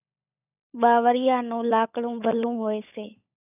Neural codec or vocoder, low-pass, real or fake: codec, 16 kHz, 16 kbps, FunCodec, trained on LibriTTS, 50 frames a second; 3.6 kHz; fake